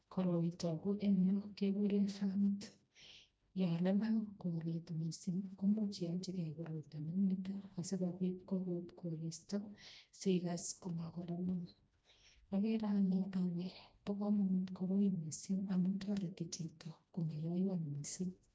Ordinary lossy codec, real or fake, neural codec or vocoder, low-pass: none; fake; codec, 16 kHz, 1 kbps, FreqCodec, smaller model; none